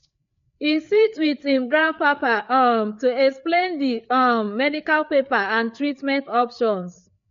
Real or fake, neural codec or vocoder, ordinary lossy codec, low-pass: fake; codec, 16 kHz, 4 kbps, FreqCodec, larger model; MP3, 48 kbps; 7.2 kHz